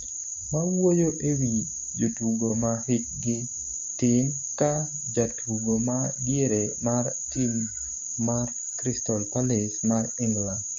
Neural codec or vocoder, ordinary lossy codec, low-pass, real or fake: codec, 16 kHz, 6 kbps, DAC; none; 7.2 kHz; fake